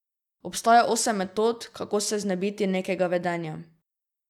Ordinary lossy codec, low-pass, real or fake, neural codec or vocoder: none; 19.8 kHz; real; none